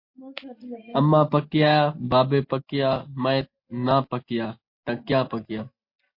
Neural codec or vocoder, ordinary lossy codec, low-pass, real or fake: none; MP3, 32 kbps; 5.4 kHz; real